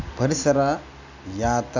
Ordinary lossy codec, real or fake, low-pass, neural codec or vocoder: none; real; 7.2 kHz; none